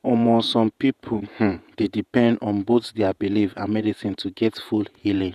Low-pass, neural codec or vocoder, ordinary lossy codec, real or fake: 14.4 kHz; vocoder, 48 kHz, 128 mel bands, Vocos; none; fake